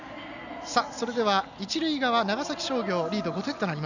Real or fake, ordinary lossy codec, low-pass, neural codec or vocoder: real; none; 7.2 kHz; none